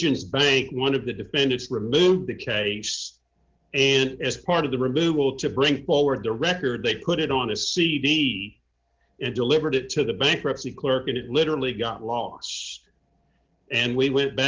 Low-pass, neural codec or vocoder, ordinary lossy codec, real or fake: 7.2 kHz; none; Opus, 16 kbps; real